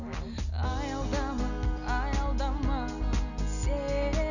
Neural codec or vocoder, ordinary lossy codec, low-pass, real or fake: none; none; 7.2 kHz; real